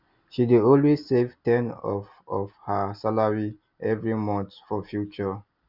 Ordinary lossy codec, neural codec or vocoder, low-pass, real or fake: Opus, 64 kbps; none; 5.4 kHz; real